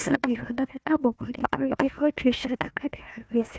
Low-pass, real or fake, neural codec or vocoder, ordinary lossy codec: none; fake; codec, 16 kHz, 1 kbps, FunCodec, trained on Chinese and English, 50 frames a second; none